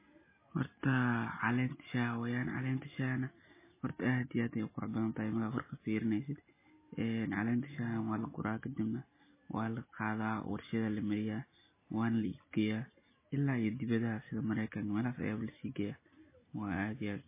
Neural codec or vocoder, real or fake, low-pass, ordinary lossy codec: none; real; 3.6 kHz; MP3, 16 kbps